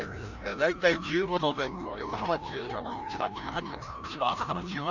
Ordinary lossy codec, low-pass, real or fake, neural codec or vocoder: none; 7.2 kHz; fake; codec, 16 kHz, 1 kbps, FreqCodec, larger model